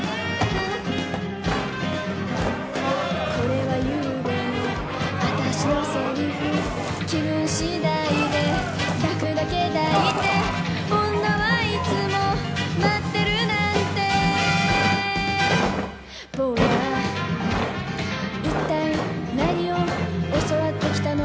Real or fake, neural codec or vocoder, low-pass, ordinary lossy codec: real; none; none; none